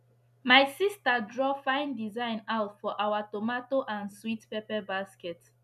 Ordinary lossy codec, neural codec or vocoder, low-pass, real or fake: none; none; 14.4 kHz; real